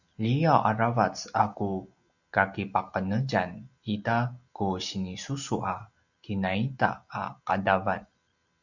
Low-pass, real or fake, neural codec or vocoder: 7.2 kHz; real; none